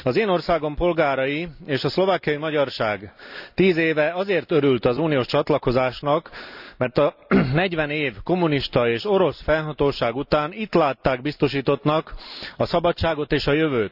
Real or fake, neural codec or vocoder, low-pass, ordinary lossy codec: real; none; 5.4 kHz; none